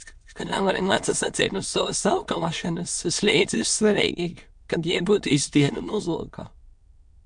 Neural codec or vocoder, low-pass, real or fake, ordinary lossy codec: autoencoder, 22.05 kHz, a latent of 192 numbers a frame, VITS, trained on many speakers; 9.9 kHz; fake; MP3, 64 kbps